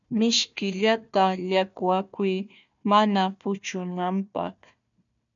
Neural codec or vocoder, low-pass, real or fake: codec, 16 kHz, 1 kbps, FunCodec, trained on Chinese and English, 50 frames a second; 7.2 kHz; fake